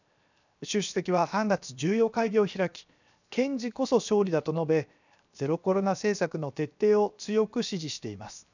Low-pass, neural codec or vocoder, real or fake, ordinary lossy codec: 7.2 kHz; codec, 16 kHz, 0.7 kbps, FocalCodec; fake; none